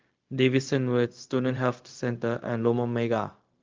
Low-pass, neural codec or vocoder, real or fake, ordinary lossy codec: 7.2 kHz; codec, 16 kHz, 0.4 kbps, LongCat-Audio-Codec; fake; Opus, 32 kbps